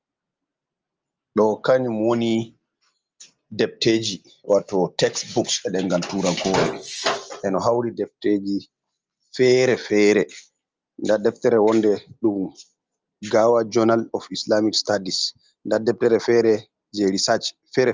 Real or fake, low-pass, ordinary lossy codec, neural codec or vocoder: real; 7.2 kHz; Opus, 24 kbps; none